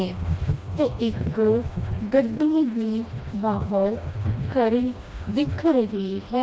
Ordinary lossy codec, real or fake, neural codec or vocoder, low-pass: none; fake; codec, 16 kHz, 1 kbps, FreqCodec, smaller model; none